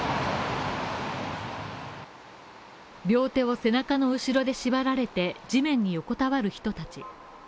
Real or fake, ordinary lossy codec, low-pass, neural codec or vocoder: real; none; none; none